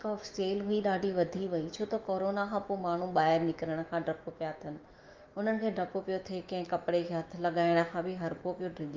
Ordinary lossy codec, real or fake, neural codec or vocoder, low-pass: Opus, 24 kbps; fake; autoencoder, 48 kHz, 128 numbers a frame, DAC-VAE, trained on Japanese speech; 7.2 kHz